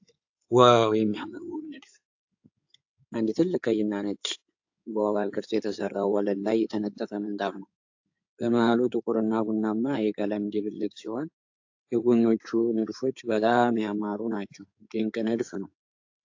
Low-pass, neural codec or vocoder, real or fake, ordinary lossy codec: 7.2 kHz; codec, 16 kHz in and 24 kHz out, 2.2 kbps, FireRedTTS-2 codec; fake; AAC, 48 kbps